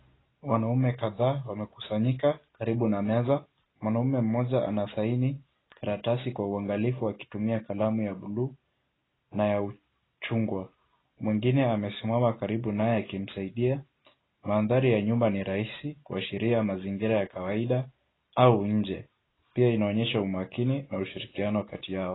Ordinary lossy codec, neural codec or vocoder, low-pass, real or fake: AAC, 16 kbps; none; 7.2 kHz; real